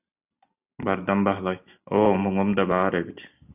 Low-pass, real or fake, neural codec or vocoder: 3.6 kHz; real; none